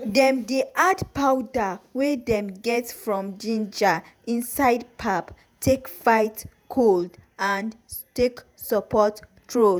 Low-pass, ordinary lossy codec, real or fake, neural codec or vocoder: none; none; fake; vocoder, 48 kHz, 128 mel bands, Vocos